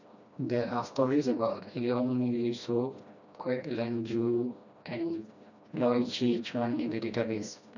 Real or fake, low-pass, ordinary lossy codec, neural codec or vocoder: fake; 7.2 kHz; none; codec, 16 kHz, 1 kbps, FreqCodec, smaller model